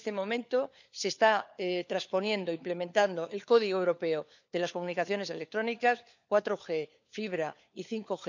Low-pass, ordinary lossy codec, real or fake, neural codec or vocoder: 7.2 kHz; none; fake; codec, 16 kHz, 4 kbps, FunCodec, trained on LibriTTS, 50 frames a second